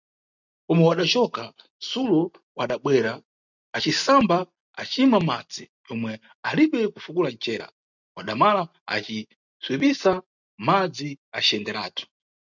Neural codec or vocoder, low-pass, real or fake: none; 7.2 kHz; real